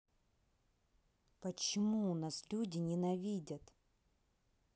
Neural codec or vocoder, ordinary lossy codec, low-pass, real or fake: none; none; none; real